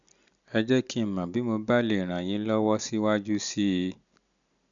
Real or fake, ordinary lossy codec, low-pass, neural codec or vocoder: real; none; 7.2 kHz; none